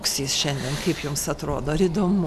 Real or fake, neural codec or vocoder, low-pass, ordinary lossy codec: real; none; 14.4 kHz; MP3, 96 kbps